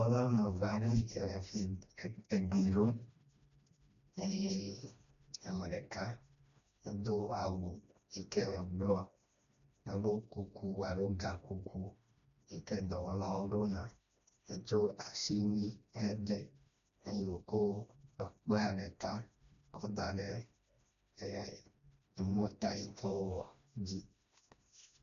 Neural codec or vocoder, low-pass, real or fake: codec, 16 kHz, 1 kbps, FreqCodec, smaller model; 7.2 kHz; fake